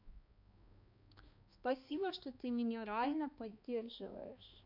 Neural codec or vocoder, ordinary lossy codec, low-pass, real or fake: codec, 16 kHz, 2 kbps, X-Codec, HuBERT features, trained on balanced general audio; MP3, 48 kbps; 5.4 kHz; fake